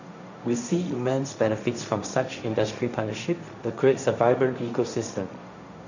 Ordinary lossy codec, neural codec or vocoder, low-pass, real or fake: none; codec, 16 kHz, 1.1 kbps, Voila-Tokenizer; 7.2 kHz; fake